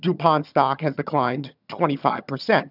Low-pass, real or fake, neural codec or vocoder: 5.4 kHz; fake; vocoder, 22.05 kHz, 80 mel bands, HiFi-GAN